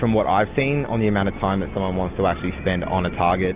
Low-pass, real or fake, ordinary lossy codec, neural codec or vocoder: 3.6 kHz; real; Opus, 64 kbps; none